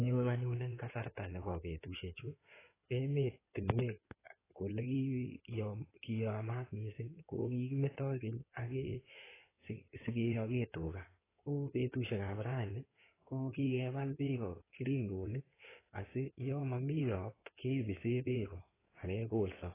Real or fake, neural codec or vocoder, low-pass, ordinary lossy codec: fake; codec, 16 kHz in and 24 kHz out, 2.2 kbps, FireRedTTS-2 codec; 3.6 kHz; AAC, 16 kbps